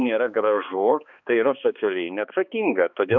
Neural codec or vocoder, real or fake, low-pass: codec, 16 kHz, 2 kbps, X-Codec, HuBERT features, trained on balanced general audio; fake; 7.2 kHz